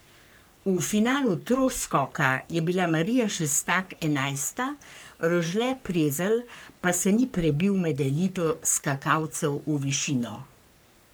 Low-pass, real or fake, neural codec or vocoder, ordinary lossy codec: none; fake; codec, 44.1 kHz, 3.4 kbps, Pupu-Codec; none